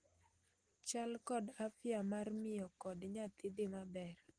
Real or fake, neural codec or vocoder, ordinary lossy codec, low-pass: real; none; Opus, 24 kbps; 9.9 kHz